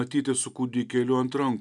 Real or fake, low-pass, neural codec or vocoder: real; 10.8 kHz; none